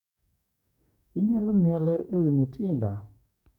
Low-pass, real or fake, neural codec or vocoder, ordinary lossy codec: 19.8 kHz; fake; codec, 44.1 kHz, 2.6 kbps, DAC; none